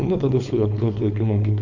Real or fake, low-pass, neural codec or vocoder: fake; 7.2 kHz; codec, 16 kHz, 4.8 kbps, FACodec